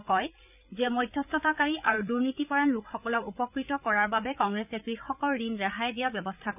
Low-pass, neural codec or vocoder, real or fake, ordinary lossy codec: 3.6 kHz; codec, 16 kHz, 8 kbps, FreqCodec, larger model; fake; none